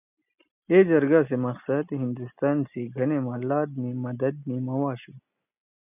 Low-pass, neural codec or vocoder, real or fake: 3.6 kHz; none; real